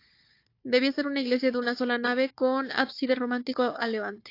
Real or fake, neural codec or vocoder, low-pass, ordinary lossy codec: fake; codec, 16 kHz, 4 kbps, FunCodec, trained on Chinese and English, 50 frames a second; 5.4 kHz; AAC, 32 kbps